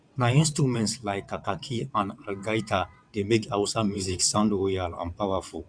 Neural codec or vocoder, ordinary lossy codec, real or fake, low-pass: vocoder, 22.05 kHz, 80 mel bands, WaveNeXt; none; fake; 9.9 kHz